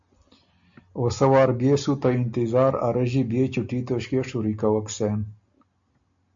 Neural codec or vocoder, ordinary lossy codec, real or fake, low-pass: none; AAC, 64 kbps; real; 7.2 kHz